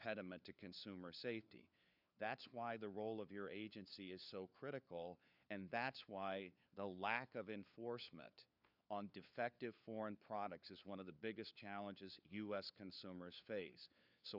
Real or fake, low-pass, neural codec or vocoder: fake; 5.4 kHz; codec, 16 kHz, 4 kbps, FunCodec, trained on LibriTTS, 50 frames a second